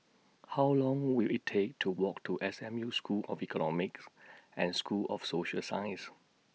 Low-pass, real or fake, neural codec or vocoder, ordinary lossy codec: none; real; none; none